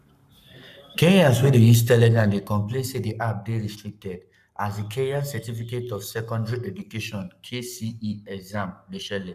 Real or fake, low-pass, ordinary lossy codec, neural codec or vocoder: fake; 14.4 kHz; none; codec, 44.1 kHz, 7.8 kbps, Pupu-Codec